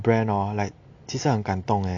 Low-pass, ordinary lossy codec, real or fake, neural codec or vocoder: 7.2 kHz; AAC, 64 kbps; real; none